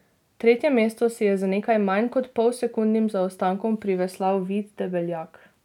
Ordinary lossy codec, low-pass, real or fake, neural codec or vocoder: none; 19.8 kHz; real; none